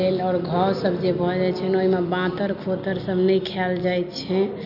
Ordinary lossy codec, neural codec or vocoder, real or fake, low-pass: none; none; real; 5.4 kHz